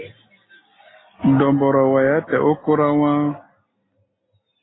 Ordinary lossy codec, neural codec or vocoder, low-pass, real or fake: AAC, 16 kbps; none; 7.2 kHz; real